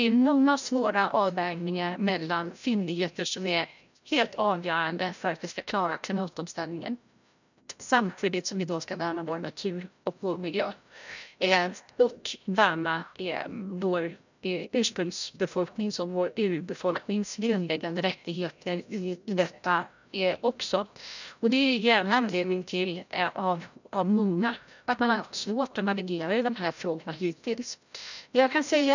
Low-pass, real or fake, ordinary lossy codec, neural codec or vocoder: 7.2 kHz; fake; none; codec, 16 kHz, 0.5 kbps, FreqCodec, larger model